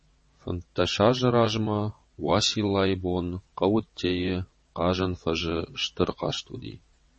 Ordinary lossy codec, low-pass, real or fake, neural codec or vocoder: MP3, 32 kbps; 9.9 kHz; fake; vocoder, 22.05 kHz, 80 mel bands, WaveNeXt